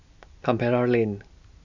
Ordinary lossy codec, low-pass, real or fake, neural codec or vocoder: none; 7.2 kHz; real; none